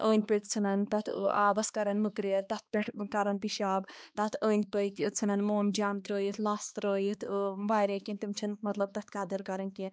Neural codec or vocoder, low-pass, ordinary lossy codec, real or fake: codec, 16 kHz, 2 kbps, X-Codec, HuBERT features, trained on balanced general audio; none; none; fake